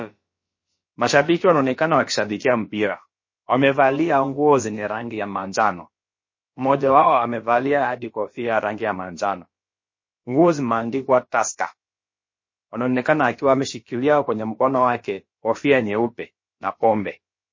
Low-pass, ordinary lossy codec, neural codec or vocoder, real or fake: 7.2 kHz; MP3, 32 kbps; codec, 16 kHz, about 1 kbps, DyCAST, with the encoder's durations; fake